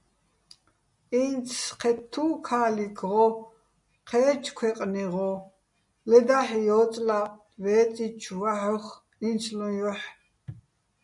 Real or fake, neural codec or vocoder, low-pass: real; none; 10.8 kHz